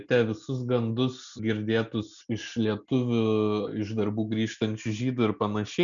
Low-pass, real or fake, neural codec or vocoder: 7.2 kHz; real; none